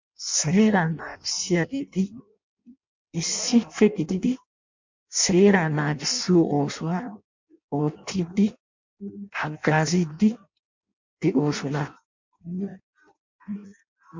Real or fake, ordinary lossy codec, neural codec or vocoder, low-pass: fake; MP3, 48 kbps; codec, 16 kHz in and 24 kHz out, 0.6 kbps, FireRedTTS-2 codec; 7.2 kHz